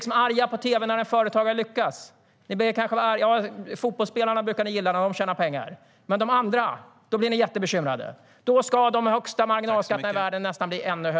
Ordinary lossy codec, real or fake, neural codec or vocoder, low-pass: none; real; none; none